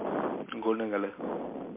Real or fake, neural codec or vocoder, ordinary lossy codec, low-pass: real; none; MP3, 24 kbps; 3.6 kHz